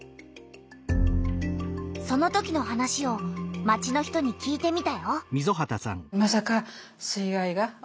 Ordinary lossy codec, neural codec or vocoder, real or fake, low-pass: none; none; real; none